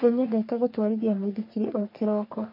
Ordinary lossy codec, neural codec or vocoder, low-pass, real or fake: MP3, 32 kbps; codec, 44.1 kHz, 3.4 kbps, Pupu-Codec; 5.4 kHz; fake